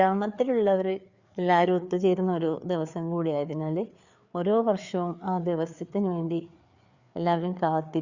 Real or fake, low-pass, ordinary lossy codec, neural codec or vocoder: fake; 7.2 kHz; Opus, 64 kbps; codec, 16 kHz, 4 kbps, FreqCodec, larger model